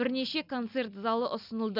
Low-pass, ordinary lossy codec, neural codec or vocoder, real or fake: 5.4 kHz; none; none; real